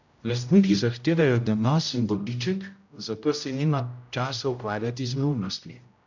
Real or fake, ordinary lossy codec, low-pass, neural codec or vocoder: fake; none; 7.2 kHz; codec, 16 kHz, 0.5 kbps, X-Codec, HuBERT features, trained on general audio